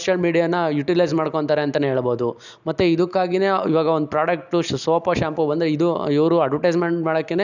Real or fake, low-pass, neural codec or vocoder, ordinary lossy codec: real; 7.2 kHz; none; none